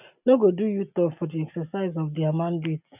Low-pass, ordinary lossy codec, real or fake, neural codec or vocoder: 3.6 kHz; none; real; none